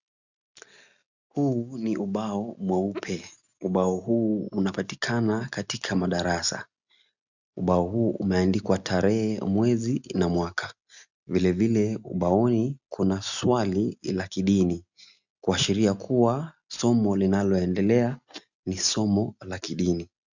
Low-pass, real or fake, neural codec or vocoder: 7.2 kHz; real; none